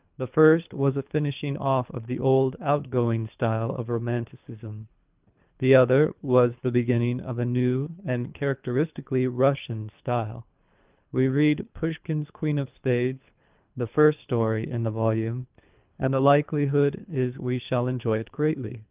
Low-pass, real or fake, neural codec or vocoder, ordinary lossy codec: 3.6 kHz; fake; codec, 24 kHz, 3 kbps, HILCodec; Opus, 24 kbps